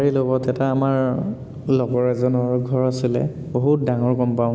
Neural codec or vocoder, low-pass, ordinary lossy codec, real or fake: none; none; none; real